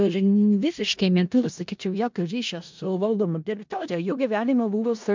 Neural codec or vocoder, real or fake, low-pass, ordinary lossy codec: codec, 16 kHz in and 24 kHz out, 0.4 kbps, LongCat-Audio-Codec, four codebook decoder; fake; 7.2 kHz; MP3, 64 kbps